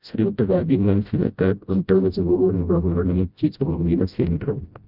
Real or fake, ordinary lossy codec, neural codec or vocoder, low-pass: fake; Opus, 24 kbps; codec, 16 kHz, 0.5 kbps, FreqCodec, smaller model; 5.4 kHz